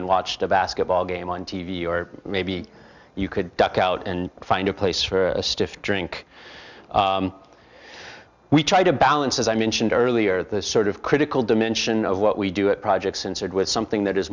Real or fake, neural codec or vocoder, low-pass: real; none; 7.2 kHz